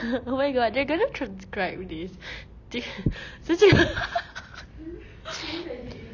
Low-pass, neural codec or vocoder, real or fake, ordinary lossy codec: 7.2 kHz; none; real; none